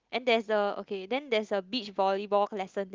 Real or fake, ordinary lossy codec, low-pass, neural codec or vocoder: real; Opus, 32 kbps; 7.2 kHz; none